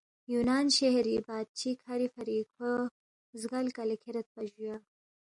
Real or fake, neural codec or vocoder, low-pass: real; none; 10.8 kHz